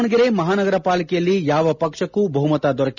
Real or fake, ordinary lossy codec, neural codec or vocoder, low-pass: real; none; none; 7.2 kHz